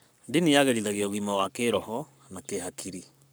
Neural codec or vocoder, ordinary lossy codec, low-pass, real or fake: codec, 44.1 kHz, 7.8 kbps, Pupu-Codec; none; none; fake